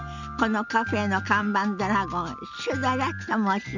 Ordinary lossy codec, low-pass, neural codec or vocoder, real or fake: none; 7.2 kHz; none; real